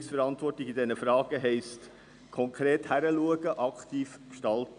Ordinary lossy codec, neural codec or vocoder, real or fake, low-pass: none; none; real; 9.9 kHz